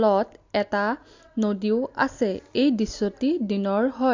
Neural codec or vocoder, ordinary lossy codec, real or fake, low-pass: none; AAC, 48 kbps; real; 7.2 kHz